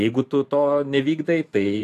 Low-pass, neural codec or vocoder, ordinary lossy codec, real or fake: 14.4 kHz; vocoder, 44.1 kHz, 128 mel bands every 512 samples, BigVGAN v2; AAC, 64 kbps; fake